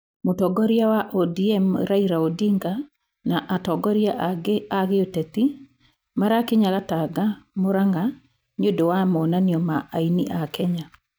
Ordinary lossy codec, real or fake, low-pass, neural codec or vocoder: none; real; none; none